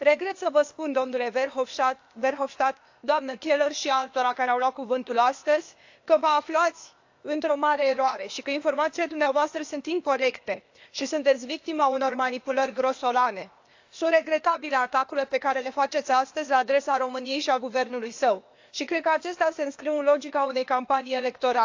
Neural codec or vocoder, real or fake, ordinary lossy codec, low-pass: codec, 16 kHz, 0.8 kbps, ZipCodec; fake; AAC, 48 kbps; 7.2 kHz